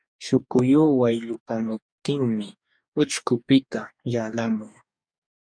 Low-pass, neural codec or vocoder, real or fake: 9.9 kHz; codec, 44.1 kHz, 2.6 kbps, DAC; fake